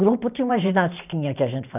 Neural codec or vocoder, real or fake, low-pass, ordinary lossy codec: vocoder, 44.1 kHz, 128 mel bands every 512 samples, BigVGAN v2; fake; 3.6 kHz; none